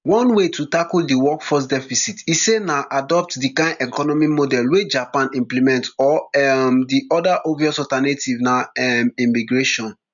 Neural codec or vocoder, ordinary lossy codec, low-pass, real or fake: none; none; 7.2 kHz; real